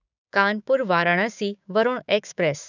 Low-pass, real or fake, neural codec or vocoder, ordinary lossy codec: 7.2 kHz; fake; codec, 16 kHz, 6 kbps, DAC; none